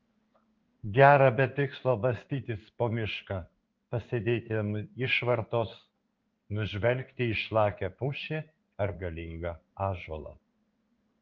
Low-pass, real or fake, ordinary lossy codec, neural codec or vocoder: 7.2 kHz; fake; Opus, 24 kbps; codec, 16 kHz in and 24 kHz out, 1 kbps, XY-Tokenizer